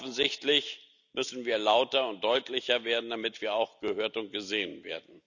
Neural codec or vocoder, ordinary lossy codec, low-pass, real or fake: none; none; 7.2 kHz; real